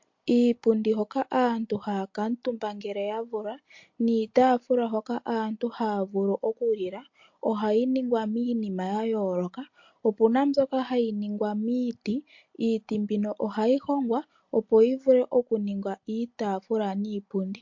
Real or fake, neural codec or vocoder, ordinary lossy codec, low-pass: real; none; MP3, 48 kbps; 7.2 kHz